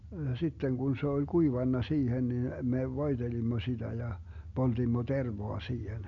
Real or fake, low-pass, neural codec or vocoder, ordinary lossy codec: real; 7.2 kHz; none; MP3, 64 kbps